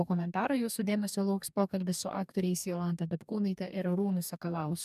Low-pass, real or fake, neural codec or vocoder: 14.4 kHz; fake; codec, 44.1 kHz, 2.6 kbps, DAC